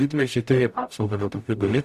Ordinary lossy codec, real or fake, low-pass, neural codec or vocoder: Opus, 64 kbps; fake; 14.4 kHz; codec, 44.1 kHz, 0.9 kbps, DAC